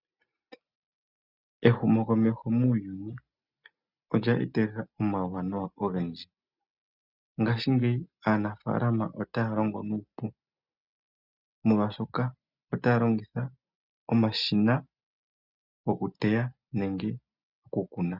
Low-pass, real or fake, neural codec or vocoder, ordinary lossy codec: 5.4 kHz; real; none; Opus, 64 kbps